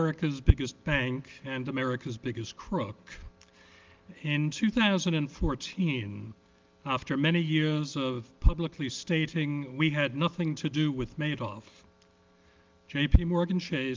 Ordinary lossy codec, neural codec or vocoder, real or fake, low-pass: Opus, 32 kbps; none; real; 7.2 kHz